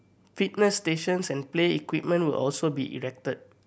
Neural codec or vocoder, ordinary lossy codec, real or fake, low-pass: none; none; real; none